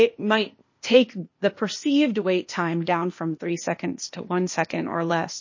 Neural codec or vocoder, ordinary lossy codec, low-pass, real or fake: codec, 16 kHz, 0.8 kbps, ZipCodec; MP3, 32 kbps; 7.2 kHz; fake